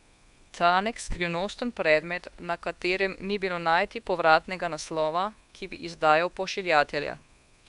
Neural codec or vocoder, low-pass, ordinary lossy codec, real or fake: codec, 24 kHz, 1.2 kbps, DualCodec; 10.8 kHz; none; fake